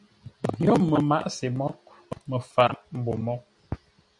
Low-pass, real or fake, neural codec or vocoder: 10.8 kHz; real; none